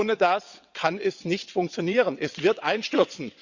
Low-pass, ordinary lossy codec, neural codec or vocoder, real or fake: 7.2 kHz; Opus, 64 kbps; vocoder, 22.05 kHz, 80 mel bands, WaveNeXt; fake